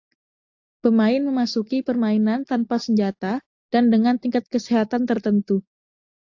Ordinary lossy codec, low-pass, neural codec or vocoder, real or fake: AAC, 48 kbps; 7.2 kHz; none; real